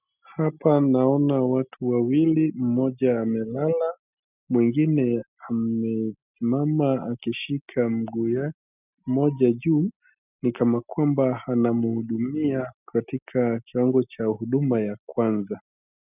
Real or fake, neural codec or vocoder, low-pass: real; none; 3.6 kHz